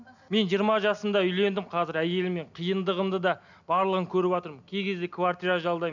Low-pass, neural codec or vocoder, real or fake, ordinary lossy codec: 7.2 kHz; none; real; none